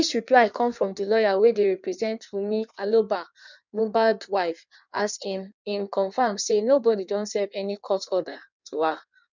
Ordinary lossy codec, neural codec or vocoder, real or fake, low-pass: none; codec, 16 kHz in and 24 kHz out, 1.1 kbps, FireRedTTS-2 codec; fake; 7.2 kHz